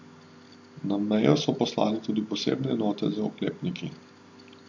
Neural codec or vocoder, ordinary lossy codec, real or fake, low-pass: none; MP3, 48 kbps; real; 7.2 kHz